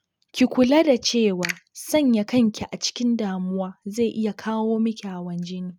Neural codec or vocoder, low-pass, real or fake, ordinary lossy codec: none; 19.8 kHz; real; none